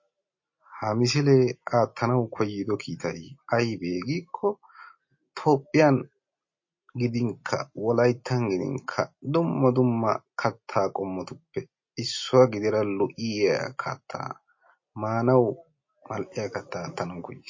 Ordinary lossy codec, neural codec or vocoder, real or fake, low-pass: MP3, 32 kbps; none; real; 7.2 kHz